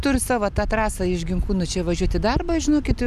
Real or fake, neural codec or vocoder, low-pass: real; none; 14.4 kHz